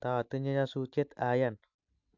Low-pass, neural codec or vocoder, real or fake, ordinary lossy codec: 7.2 kHz; none; real; AAC, 48 kbps